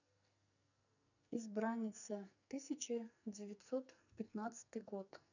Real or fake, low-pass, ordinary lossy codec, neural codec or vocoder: fake; 7.2 kHz; none; codec, 44.1 kHz, 2.6 kbps, SNAC